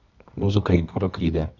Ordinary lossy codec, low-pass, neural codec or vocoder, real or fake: none; 7.2 kHz; codec, 24 kHz, 0.9 kbps, WavTokenizer, medium music audio release; fake